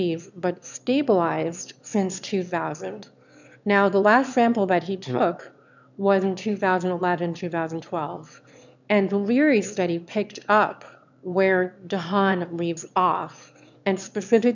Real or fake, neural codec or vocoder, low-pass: fake; autoencoder, 22.05 kHz, a latent of 192 numbers a frame, VITS, trained on one speaker; 7.2 kHz